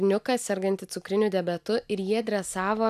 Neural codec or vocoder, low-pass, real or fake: none; 14.4 kHz; real